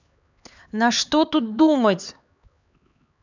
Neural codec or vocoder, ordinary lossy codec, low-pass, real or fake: codec, 16 kHz, 4 kbps, X-Codec, HuBERT features, trained on LibriSpeech; none; 7.2 kHz; fake